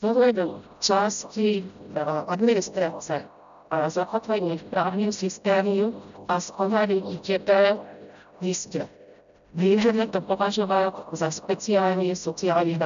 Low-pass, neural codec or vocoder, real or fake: 7.2 kHz; codec, 16 kHz, 0.5 kbps, FreqCodec, smaller model; fake